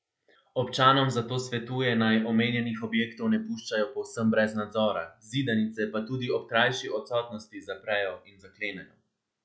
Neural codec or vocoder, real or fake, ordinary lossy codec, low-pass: none; real; none; none